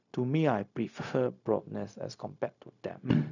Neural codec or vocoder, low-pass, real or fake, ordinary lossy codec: codec, 16 kHz, 0.4 kbps, LongCat-Audio-Codec; 7.2 kHz; fake; none